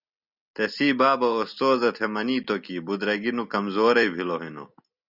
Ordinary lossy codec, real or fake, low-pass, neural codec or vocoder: Opus, 64 kbps; real; 5.4 kHz; none